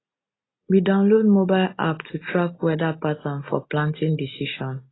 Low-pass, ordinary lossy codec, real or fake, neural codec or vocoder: 7.2 kHz; AAC, 16 kbps; real; none